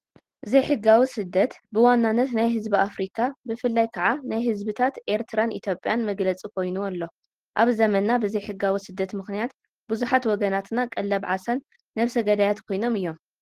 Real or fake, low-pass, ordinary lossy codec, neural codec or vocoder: real; 14.4 kHz; Opus, 16 kbps; none